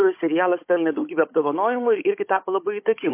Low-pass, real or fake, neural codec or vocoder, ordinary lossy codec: 3.6 kHz; fake; codec, 16 kHz, 16 kbps, FunCodec, trained on Chinese and English, 50 frames a second; MP3, 24 kbps